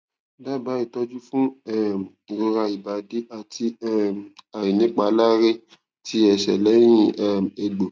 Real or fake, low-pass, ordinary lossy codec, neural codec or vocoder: real; none; none; none